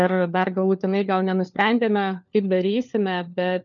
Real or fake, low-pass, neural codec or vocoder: fake; 7.2 kHz; codec, 16 kHz, 4 kbps, FunCodec, trained on LibriTTS, 50 frames a second